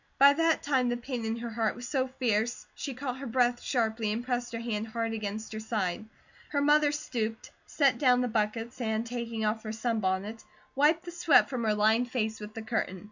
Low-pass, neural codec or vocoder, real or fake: 7.2 kHz; none; real